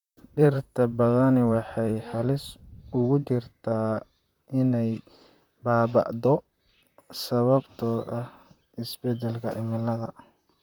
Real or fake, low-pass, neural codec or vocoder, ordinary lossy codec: fake; 19.8 kHz; vocoder, 44.1 kHz, 128 mel bands, Pupu-Vocoder; none